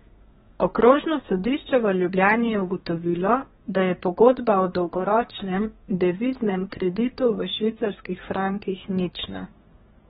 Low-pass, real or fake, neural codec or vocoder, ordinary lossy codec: 14.4 kHz; fake; codec, 32 kHz, 1.9 kbps, SNAC; AAC, 16 kbps